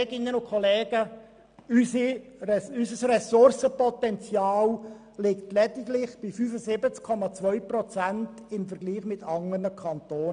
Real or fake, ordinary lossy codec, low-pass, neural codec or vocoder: real; none; 9.9 kHz; none